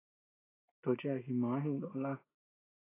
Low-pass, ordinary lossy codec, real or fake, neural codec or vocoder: 3.6 kHz; AAC, 16 kbps; real; none